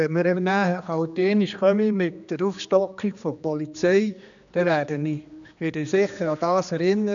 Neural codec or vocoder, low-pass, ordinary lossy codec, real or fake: codec, 16 kHz, 2 kbps, X-Codec, HuBERT features, trained on general audio; 7.2 kHz; none; fake